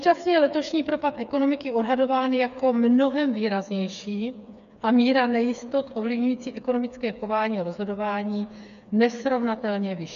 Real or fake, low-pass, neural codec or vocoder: fake; 7.2 kHz; codec, 16 kHz, 4 kbps, FreqCodec, smaller model